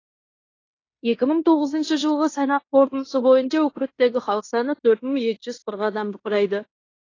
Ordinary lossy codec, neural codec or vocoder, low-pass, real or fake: AAC, 32 kbps; codec, 16 kHz in and 24 kHz out, 0.9 kbps, LongCat-Audio-Codec, fine tuned four codebook decoder; 7.2 kHz; fake